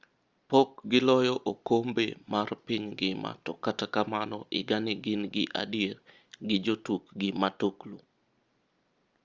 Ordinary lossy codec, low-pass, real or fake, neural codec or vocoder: Opus, 32 kbps; 7.2 kHz; real; none